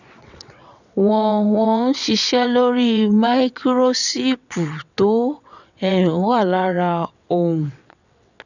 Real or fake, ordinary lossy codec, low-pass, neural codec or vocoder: fake; none; 7.2 kHz; vocoder, 22.05 kHz, 80 mel bands, WaveNeXt